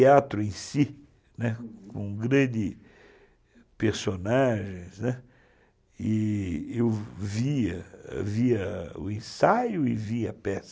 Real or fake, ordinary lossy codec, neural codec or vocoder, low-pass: real; none; none; none